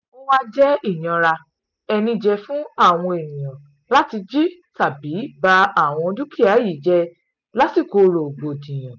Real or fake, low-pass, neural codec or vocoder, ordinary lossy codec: real; 7.2 kHz; none; none